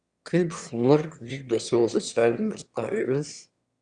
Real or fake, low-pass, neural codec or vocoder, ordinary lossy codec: fake; 9.9 kHz; autoencoder, 22.05 kHz, a latent of 192 numbers a frame, VITS, trained on one speaker; Opus, 64 kbps